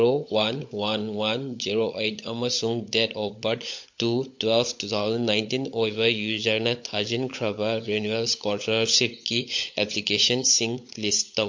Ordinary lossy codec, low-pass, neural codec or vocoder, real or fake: MP3, 48 kbps; 7.2 kHz; codec, 16 kHz, 16 kbps, FunCodec, trained on LibriTTS, 50 frames a second; fake